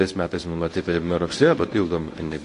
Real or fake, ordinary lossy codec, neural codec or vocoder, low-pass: fake; AAC, 48 kbps; codec, 24 kHz, 0.9 kbps, WavTokenizer, medium speech release version 1; 10.8 kHz